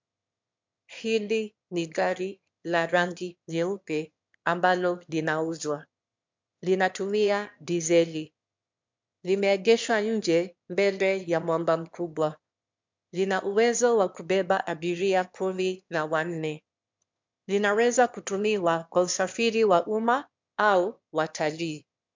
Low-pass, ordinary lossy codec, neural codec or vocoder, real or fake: 7.2 kHz; MP3, 64 kbps; autoencoder, 22.05 kHz, a latent of 192 numbers a frame, VITS, trained on one speaker; fake